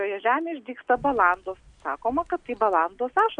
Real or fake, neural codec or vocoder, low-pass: real; none; 9.9 kHz